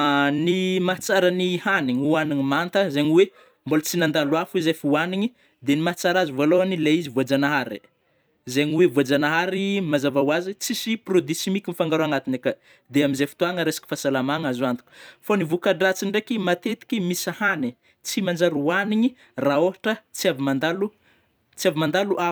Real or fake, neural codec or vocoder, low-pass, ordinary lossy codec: fake; vocoder, 44.1 kHz, 128 mel bands every 256 samples, BigVGAN v2; none; none